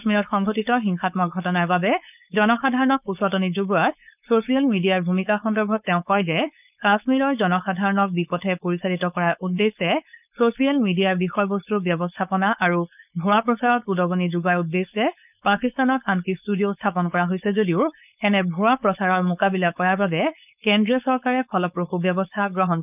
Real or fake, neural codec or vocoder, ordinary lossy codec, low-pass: fake; codec, 16 kHz, 4.8 kbps, FACodec; none; 3.6 kHz